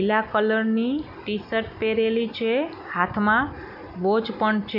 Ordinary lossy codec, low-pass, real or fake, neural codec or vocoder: none; 5.4 kHz; real; none